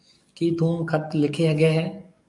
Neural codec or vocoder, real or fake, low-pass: codec, 44.1 kHz, 7.8 kbps, DAC; fake; 10.8 kHz